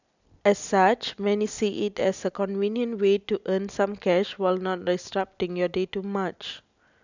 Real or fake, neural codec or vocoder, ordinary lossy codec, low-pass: real; none; none; 7.2 kHz